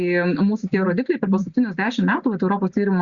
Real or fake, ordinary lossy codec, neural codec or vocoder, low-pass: real; Opus, 64 kbps; none; 7.2 kHz